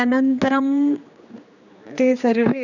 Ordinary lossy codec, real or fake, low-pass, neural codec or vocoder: none; fake; 7.2 kHz; codec, 16 kHz, 2 kbps, X-Codec, HuBERT features, trained on general audio